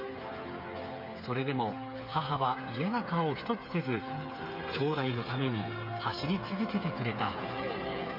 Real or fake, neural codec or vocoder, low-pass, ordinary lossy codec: fake; codec, 16 kHz, 8 kbps, FreqCodec, smaller model; 5.4 kHz; MP3, 48 kbps